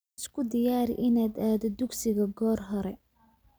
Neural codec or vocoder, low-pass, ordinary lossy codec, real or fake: none; none; none; real